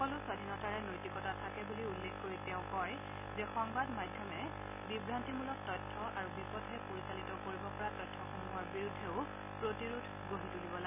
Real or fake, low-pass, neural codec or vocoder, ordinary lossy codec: real; 3.6 kHz; none; none